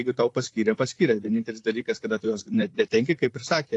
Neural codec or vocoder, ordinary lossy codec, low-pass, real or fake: vocoder, 44.1 kHz, 128 mel bands, Pupu-Vocoder; AAC, 48 kbps; 10.8 kHz; fake